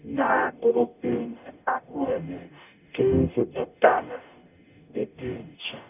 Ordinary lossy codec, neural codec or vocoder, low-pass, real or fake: none; codec, 44.1 kHz, 0.9 kbps, DAC; 3.6 kHz; fake